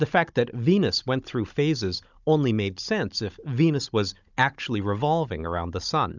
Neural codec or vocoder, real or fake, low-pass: codec, 16 kHz, 16 kbps, FunCodec, trained on Chinese and English, 50 frames a second; fake; 7.2 kHz